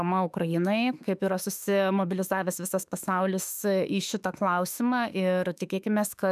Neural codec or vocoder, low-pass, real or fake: autoencoder, 48 kHz, 32 numbers a frame, DAC-VAE, trained on Japanese speech; 14.4 kHz; fake